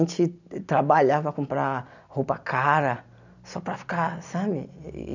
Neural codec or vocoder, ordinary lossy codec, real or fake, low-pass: none; none; real; 7.2 kHz